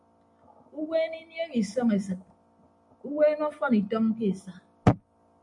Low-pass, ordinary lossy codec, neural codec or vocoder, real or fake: 10.8 kHz; MP3, 64 kbps; none; real